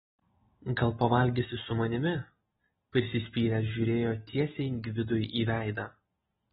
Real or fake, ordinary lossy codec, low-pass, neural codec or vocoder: real; AAC, 16 kbps; 7.2 kHz; none